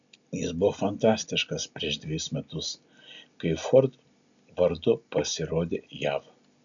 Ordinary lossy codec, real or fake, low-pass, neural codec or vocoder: MP3, 96 kbps; real; 7.2 kHz; none